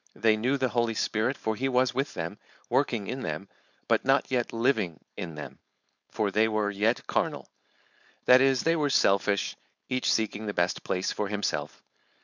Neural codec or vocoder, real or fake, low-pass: codec, 16 kHz, 4.8 kbps, FACodec; fake; 7.2 kHz